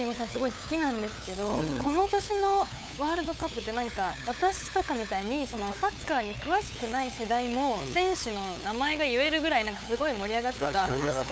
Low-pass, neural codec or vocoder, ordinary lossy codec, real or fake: none; codec, 16 kHz, 8 kbps, FunCodec, trained on LibriTTS, 25 frames a second; none; fake